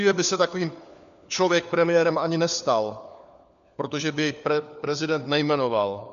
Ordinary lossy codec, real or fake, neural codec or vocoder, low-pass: MP3, 96 kbps; fake; codec, 16 kHz, 4 kbps, FunCodec, trained on LibriTTS, 50 frames a second; 7.2 kHz